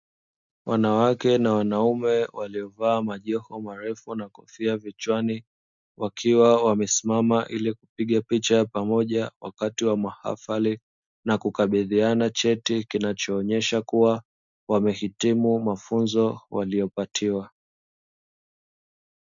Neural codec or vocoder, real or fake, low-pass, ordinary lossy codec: none; real; 7.2 kHz; MP3, 64 kbps